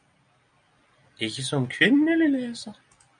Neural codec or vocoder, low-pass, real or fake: none; 9.9 kHz; real